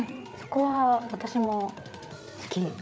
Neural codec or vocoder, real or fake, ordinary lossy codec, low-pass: codec, 16 kHz, 8 kbps, FreqCodec, larger model; fake; none; none